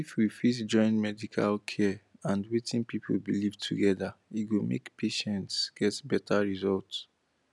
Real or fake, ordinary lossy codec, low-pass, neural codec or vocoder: real; none; none; none